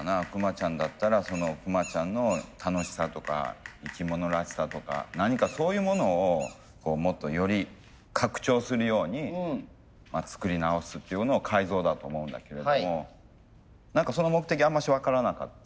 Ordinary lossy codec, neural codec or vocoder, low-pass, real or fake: none; none; none; real